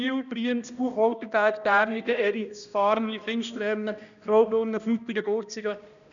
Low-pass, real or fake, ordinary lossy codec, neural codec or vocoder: 7.2 kHz; fake; none; codec, 16 kHz, 1 kbps, X-Codec, HuBERT features, trained on balanced general audio